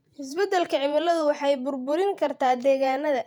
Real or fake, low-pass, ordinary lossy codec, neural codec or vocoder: fake; 19.8 kHz; none; vocoder, 48 kHz, 128 mel bands, Vocos